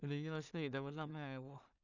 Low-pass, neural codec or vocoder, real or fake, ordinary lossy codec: 7.2 kHz; codec, 16 kHz in and 24 kHz out, 0.4 kbps, LongCat-Audio-Codec, two codebook decoder; fake; none